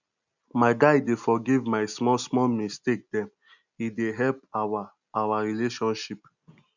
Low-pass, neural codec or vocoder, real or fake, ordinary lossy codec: 7.2 kHz; none; real; none